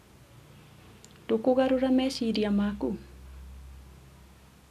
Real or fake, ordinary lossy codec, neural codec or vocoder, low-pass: real; none; none; 14.4 kHz